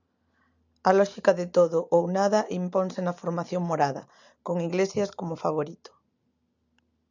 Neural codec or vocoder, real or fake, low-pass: none; real; 7.2 kHz